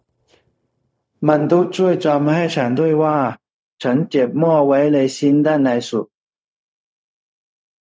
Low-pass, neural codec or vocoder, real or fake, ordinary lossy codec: none; codec, 16 kHz, 0.4 kbps, LongCat-Audio-Codec; fake; none